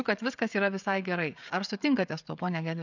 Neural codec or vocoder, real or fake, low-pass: codec, 16 kHz, 16 kbps, FreqCodec, smaller model; fake; 7.2 kHz